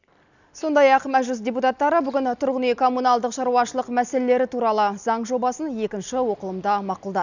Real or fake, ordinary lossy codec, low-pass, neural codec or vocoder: real; none; 7.2 kHz; none